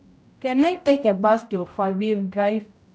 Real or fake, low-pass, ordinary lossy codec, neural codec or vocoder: fake; none; none; codec, 16 kHz, 0.5 kbps, X-Codec, HuBERT features, trained on general audio